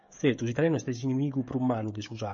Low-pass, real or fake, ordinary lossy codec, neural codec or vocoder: 7.2 kHz; fake; MP3, 48 kbps; codec, 16 kHz, 16 kbps, FreqCodec, smaller model